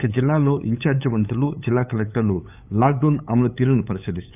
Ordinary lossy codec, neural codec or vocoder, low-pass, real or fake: none; codec, 16 kHz, 8 kbps, FunCodec, trained on LibriTTS, 25 frames a second; 3.6 kHz; fake